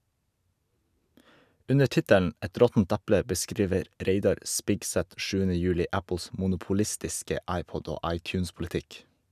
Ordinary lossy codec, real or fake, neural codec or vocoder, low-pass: none; real; none; 14.4 kHz